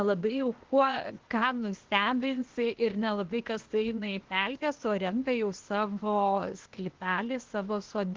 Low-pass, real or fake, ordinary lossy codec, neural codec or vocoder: 7.2 kHz; fake; Opus, 16 kbps; codec, 16 kHz, 0.8 kbps, ZipCodec